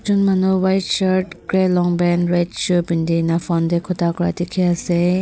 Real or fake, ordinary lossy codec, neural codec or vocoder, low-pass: real; none; none; none